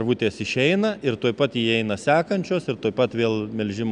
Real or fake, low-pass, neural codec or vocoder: real; 9.9 kHz; none